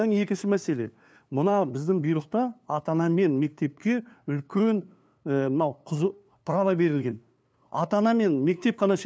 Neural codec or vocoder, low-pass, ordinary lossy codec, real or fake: codec, 16 kHz, 2 kbps, FunCodec, trained on LibriTTS, 25 frames a second; none; none; fake